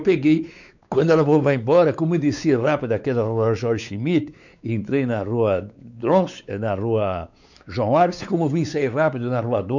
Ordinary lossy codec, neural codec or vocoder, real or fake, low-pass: none; codec, 16 kHz, 4 kbps, X-Codec, WavLM features, trained on Multilingual LibriSpeech; fake; 7.2 kHz